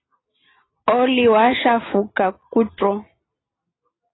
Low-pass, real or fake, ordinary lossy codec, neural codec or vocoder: 7.2 kHz; real; AAC, 16 kbps; none